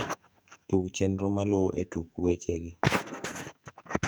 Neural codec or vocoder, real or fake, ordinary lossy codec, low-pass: codec, 44.1 kHz, 2.6 kbps, SNAC; fake; none; none